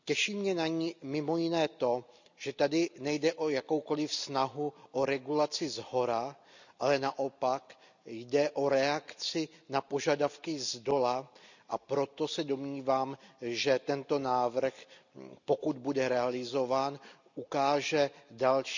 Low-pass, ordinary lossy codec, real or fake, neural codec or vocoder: 7.2 kHz; none; real; none